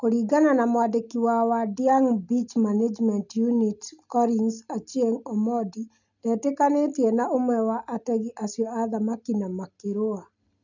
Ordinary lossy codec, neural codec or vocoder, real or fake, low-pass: none; none; real; 7.2 kHz